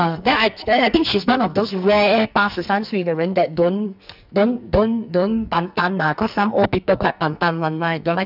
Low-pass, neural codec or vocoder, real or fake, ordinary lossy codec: 5.4 kHz; codec, 44.1 kHz, 2.6 kbps, SNAC; fake; none